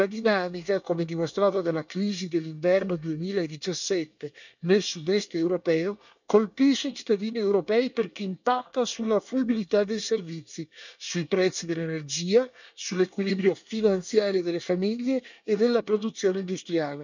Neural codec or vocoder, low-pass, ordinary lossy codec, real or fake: codec, 24 kHz, 1 kbps, SNAC; 7.2 kHz; none; fake